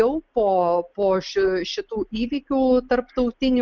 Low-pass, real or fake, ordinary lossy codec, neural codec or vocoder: 7.2 kHz; real; Opus, 32 kbps; none